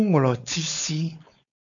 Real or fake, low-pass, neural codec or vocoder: fake; 7.2 kHz; codec, 16 kHz, 4.8 kbps, FACodec